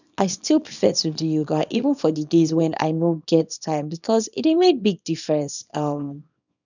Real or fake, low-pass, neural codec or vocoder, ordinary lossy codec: fake; 7.2 kHz; codec, 24 kHz, 0.9 kbps, WavTokenizer, small release; none